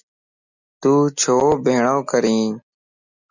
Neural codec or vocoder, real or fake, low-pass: none; real; 7.2 kHz